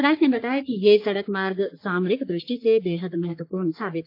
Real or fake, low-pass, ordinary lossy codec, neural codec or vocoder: fake; 5.4 kHz; AAC, 32 kbps; codec, 44.1 kHz, 3.4 kbps, Pupu-Codec